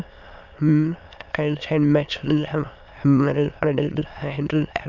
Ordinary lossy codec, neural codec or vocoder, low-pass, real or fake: none; autoencoder, 22.05 kHz, a latent of 192 numbers a frame, VITS, trained on many speakers; 7.2 kHz; fake